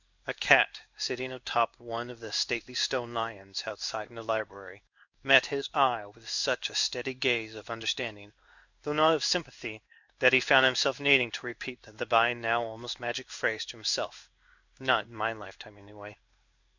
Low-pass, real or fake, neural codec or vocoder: 7.2 kHz; fake; codec, 16 kHz in and 24 kHz out, 1 kbps, XY-Tokenizer